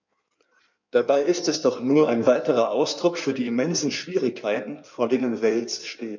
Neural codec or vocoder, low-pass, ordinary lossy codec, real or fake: codec, 16 kHz in and 24 kHz out, 1.1 kbps, FireRedTTS-2 codec; 7.2 kHz; none; fake